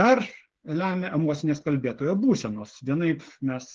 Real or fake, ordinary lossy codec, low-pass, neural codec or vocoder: fake; Opus, 16 kbps; 7.2 kHz; codec, 16 kHz, 8 kbps, FreqCodec, smaller model